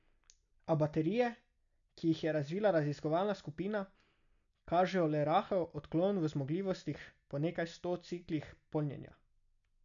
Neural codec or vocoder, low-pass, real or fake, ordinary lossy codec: none; 7.2 kHz; real; none